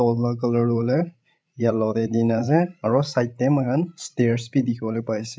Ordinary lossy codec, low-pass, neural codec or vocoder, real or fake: none; none; codec, 16 kHz, 16 kbps, FreqCodec, larger model; fake